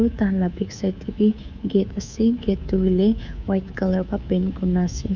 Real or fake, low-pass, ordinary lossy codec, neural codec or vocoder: fake; 7.2 kHz; none; codec, 24 kHz, 3.1 kbps, DualCodec